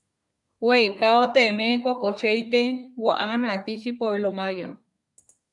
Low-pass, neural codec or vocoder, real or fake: 10.8 kHz; codec, 24 kHz, 1 kbps, SNAC; fake